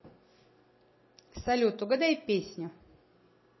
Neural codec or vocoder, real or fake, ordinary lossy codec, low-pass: none; real; MP3, 24 kbps; 7.2 kHz